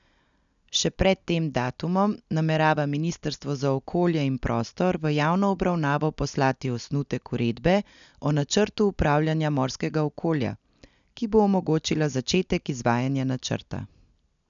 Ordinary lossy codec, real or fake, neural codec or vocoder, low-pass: none; real; none; 7.2 kHz